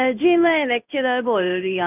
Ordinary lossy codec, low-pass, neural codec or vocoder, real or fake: AAC, 32 kbps; 3.6 kHz; codec, 16 kHz in and 24 kHz out, 1 kbps, XY-Tokenizer; fake